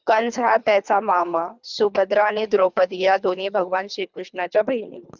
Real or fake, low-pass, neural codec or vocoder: fake; 7.2 kHz; codec, 24 kHz, 3 kbps, HILCodec